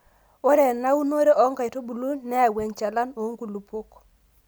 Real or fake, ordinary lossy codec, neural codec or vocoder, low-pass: real; none; none; none